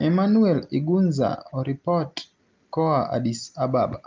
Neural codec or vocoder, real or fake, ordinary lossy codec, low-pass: none; real; Opus, 24 kbps; 7.2 kHz